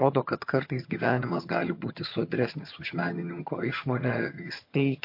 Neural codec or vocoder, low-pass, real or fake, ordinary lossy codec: vocoder, 22.05 kHz, 80 mel bands, HiFi-GAN; 5.4 kHz; fake; MP3, 32 kbps